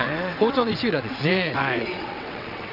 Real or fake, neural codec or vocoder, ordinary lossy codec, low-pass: fake; vocoder, 22.05 kHz, 80 mel bands, WaveNeXt; none; 5.4 kHz